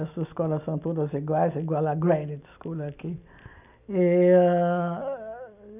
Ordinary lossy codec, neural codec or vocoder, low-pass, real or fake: none; none; 3.6 kHz; real